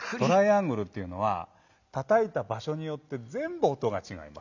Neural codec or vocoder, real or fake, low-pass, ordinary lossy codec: none; real; 7.2 kHz; none